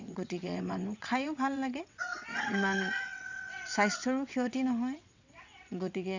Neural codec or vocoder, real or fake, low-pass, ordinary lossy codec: vocoder, 22.05 kHz, 80 mel bands, WaveNeXt; fake; 7.2 kHz; Opus, 64 kbps